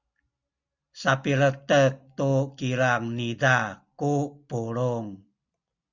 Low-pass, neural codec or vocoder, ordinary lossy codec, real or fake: 7.2 kHz; none; Opus, 64 kbps; real